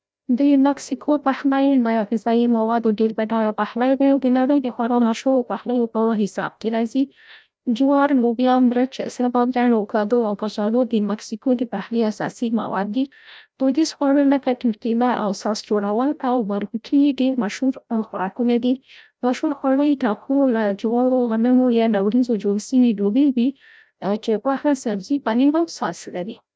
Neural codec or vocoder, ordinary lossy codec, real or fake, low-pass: codec, 16 kHz, 0.5 kbps, FreqCodec, larger model; none; fake; none